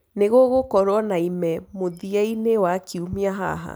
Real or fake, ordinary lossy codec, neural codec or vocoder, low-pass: real; none; none; none